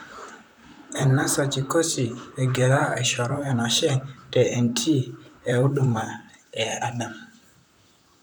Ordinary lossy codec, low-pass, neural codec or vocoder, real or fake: none; none; vocoder, 44.1 kHz, 128 mel bands, Pupu-Vocoder; fake